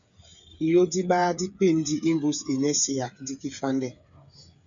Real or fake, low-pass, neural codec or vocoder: fake; 7.2 kHz; codec, 16 kHz, 8 kbps, FreqCodec, smaller model